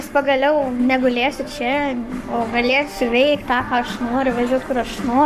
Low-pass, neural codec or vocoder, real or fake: 14.4 kHz; codec, 44.1 kHz, 7.8 kbps, Pupu-Codec; fake